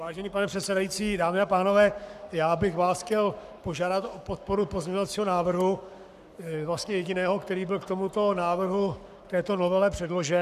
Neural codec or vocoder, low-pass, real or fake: codec, 44.1 kHz, 7.8 kbps, Pupu-Codec; 14.4 kHz; fake